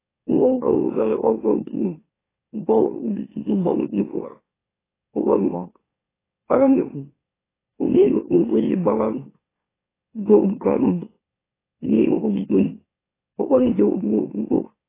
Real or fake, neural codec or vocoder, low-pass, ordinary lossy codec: fake; autoencoder, 44.1 kHz, a latent of 192 numbers a frame, MeloTTS; 3.6 kHz; AAC, 16 kbps